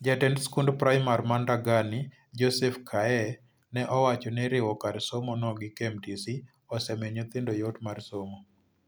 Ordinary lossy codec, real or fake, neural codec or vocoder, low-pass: none; real; none; none